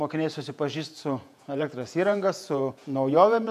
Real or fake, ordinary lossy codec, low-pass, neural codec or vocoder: fake; MP3, 96 kbps; 14.4 kHz; vocoder, 48 kHz, 128 mel bands, Vocos